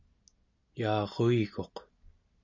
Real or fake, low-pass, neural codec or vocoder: real; 7.2 kHz; none